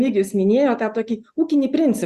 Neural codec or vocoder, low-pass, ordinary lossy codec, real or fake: none; 14.4 kHz; Opus, 64 kbps; real